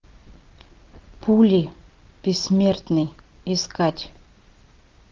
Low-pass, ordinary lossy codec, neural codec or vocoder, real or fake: 7.2 kHz; Opus, 24 kbps; none; real